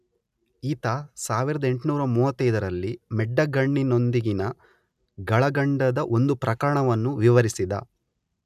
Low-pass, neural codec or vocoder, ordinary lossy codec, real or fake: 14.4 kHz; none; none; real